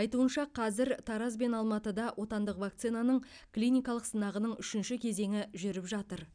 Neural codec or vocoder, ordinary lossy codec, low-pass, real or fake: none; none; 9.9 kHz; real